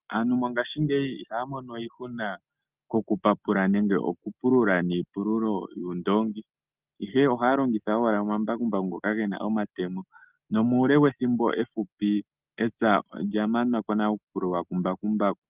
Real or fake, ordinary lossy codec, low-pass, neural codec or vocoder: real; Opus, 24 kbps; 3.6 kHz; none